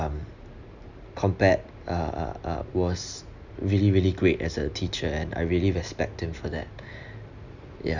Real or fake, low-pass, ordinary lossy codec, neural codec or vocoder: real; 7.2 kHz; none; none